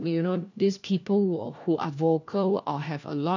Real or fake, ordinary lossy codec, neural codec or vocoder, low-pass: fake; none; codec, 16 kHz, 1 kbps, FunCodec, trained on LibriTTS, 50 frames a second; 7.2 kHz